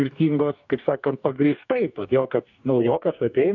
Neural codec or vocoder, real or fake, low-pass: codec, 44.1 kHz, 2.6 kbps, DAC; fake; 7.2 kHz